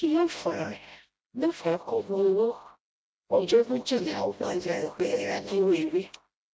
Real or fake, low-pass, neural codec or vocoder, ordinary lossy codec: fake; none; codec, 16 kHz, 0.5 kbps, FreqCodec, smaller model; none